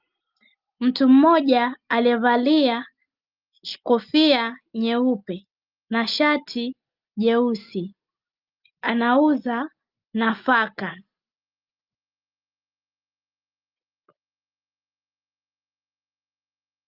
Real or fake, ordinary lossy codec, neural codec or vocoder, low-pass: real; Opus, 24 kbps; none; 5.4 kHz